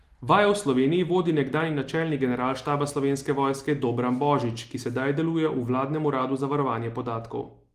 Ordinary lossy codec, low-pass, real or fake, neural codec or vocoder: Opus, 24 kbps; 14.4 kHz; real; none